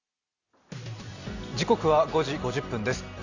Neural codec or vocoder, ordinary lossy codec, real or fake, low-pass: none; none; real; 7.2 kHz